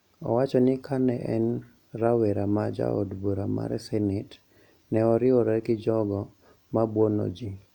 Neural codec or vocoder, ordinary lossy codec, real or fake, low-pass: none; none; real; 19.8 kHz